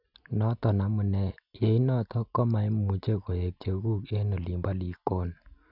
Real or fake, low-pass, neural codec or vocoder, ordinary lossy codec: real; 5.4 kHz; none; none